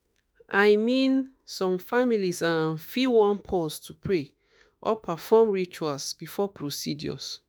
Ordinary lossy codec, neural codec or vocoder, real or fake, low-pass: none; autoencoder, 48 kHz, 32 numbers a frame, DAC-VAE, trained on Japanese speech; fake; none